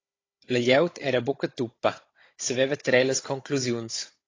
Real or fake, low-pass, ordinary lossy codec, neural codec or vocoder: fake; 7.2 kHz; AAC, 32 kbps; codec, 16 kHz, 16 kbps, FunCodec, trained on Chinese and English, 50 frames a second